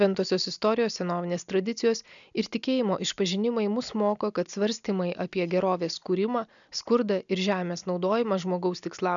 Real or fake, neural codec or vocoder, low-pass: real; none; 7.2 kHz